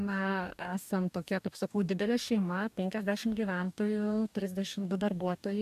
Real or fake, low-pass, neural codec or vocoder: fake; 14.4 kHz; codec, 44.1 kHz, 2.6 kbps, DAC